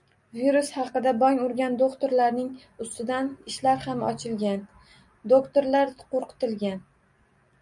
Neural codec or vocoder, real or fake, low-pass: none; real; 10.8 kHz